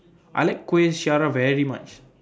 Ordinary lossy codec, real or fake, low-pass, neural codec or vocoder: none; real; none; none